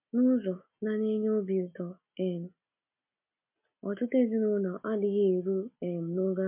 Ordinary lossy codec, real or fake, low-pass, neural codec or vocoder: MP3, 32 kbps; real; 3.6 kHz; none